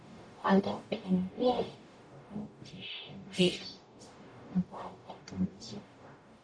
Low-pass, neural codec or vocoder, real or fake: 9.9 kHz; codec, 44.1 kHz, 0.9 kbps, DAC; fake